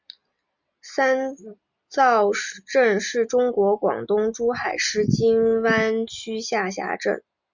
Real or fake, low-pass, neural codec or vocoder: real; 7.2 kHz; none